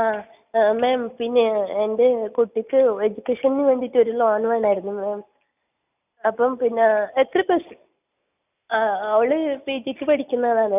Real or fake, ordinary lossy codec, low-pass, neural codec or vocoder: real; none; 3.6 kHz; none